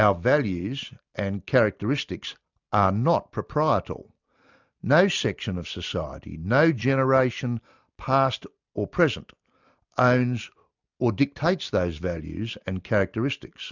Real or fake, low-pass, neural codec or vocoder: real; 7.2 kHz; none